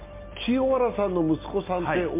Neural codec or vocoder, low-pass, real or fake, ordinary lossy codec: none; 3.6 kHz; real; MP3, 24 kbps